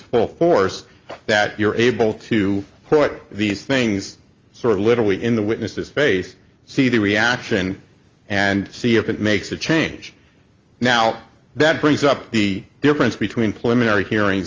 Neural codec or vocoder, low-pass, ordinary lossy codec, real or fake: none; 7.2 kHz; Opus, 32 kbps; real